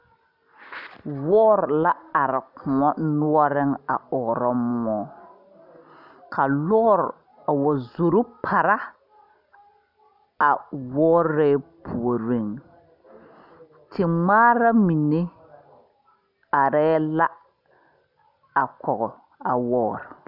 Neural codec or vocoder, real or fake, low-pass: none; real; 5.4 kHz